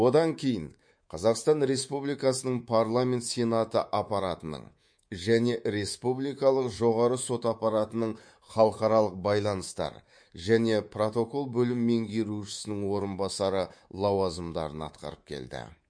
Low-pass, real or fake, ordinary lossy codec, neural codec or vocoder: 9.9 kHz; fake; MP3, 48 kbps; codec, 24 kHz, 3.1 kbps, DualCodec